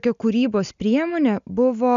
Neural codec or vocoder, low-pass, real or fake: none; 7.2 kHz; real